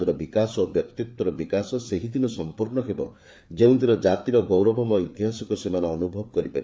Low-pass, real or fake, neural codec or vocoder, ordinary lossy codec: none; fake; codec, 16 kHz, 4 kbps, FreqCodec, larger model; none